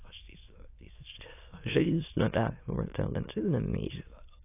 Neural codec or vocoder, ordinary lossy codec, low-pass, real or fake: autoencoder, 22.05 kHz, a latent of 192 numbers a frame, VITS, trained on many speakers; AAC, 24 kbps; 3.6 kHz; fake